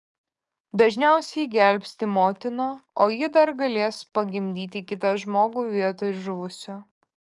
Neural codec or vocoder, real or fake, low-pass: codec, 44.1 kHz, 7.8 kbps, DAC; fake; 10.8 kHz